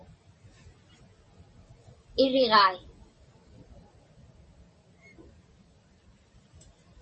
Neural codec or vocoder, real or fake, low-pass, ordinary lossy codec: none; real; 10.8 kHz; MP3, 32 kbps